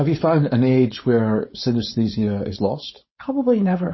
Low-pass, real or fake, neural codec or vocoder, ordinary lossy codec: 7.2 kHz; fake; codec, 16 kHz, 4.8 kbps, FACodec; MP3, 24 kbps